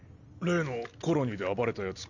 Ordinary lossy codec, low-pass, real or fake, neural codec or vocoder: none; 7.2 kHz; real; none